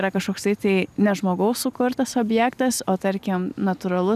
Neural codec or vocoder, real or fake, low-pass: none; real; 14.4 kHz